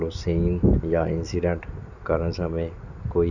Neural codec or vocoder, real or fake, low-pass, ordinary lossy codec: none; real; 7.2 kHz; none